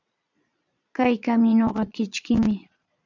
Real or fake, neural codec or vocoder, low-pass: fake; vocoder, 22.05 kHz, 80 mel bands, Vocos; 7.2 kHz